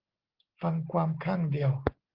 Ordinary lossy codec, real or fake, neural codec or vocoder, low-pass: Opus, 16 kbps; real; none; 5.4 kHz